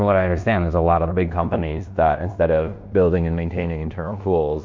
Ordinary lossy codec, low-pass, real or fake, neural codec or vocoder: MP3, 48 kbps; 7.2 kHz; fake; codec, 16 kHz in and 24 kHz out, 0.9 kbps, LongCat-Audio-Codec, fine tuned four codebook decoder